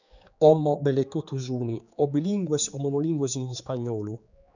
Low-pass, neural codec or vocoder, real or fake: 7.2 kHz; codec, 16 kHz, 4 kbps, X-Codec, HuBERT features, trained on general audio; fake